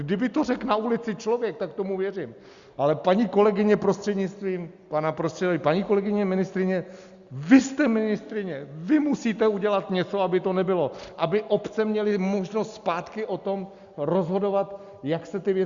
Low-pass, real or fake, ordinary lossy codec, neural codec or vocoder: 7.2 kHz; real; Opus, 64 kbps; none